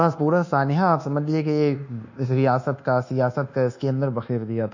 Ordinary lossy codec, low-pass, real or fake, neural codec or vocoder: none; 7.2 kHz; fake; codec, 24 kHz, 1.2 kbps, DualCodec